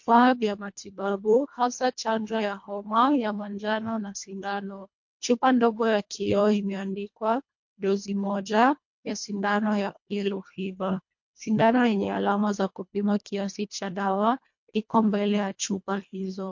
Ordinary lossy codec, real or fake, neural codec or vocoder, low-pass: MP3, 48 kbps; fake; codec, 24 kHz, 1.5 kbps, HILCodec; 7.2 kHz